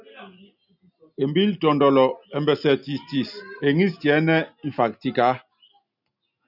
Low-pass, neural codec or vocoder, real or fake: 5.4 kHz; none; real